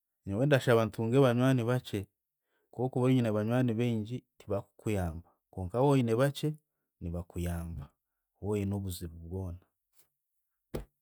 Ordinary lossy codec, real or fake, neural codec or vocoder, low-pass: none; real; none; none